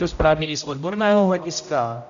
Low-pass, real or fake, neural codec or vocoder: 7.2 kHz; fake; codec, 16 kHz, 0.5 kbps, X-Codec, HuBERT features, trained on general audio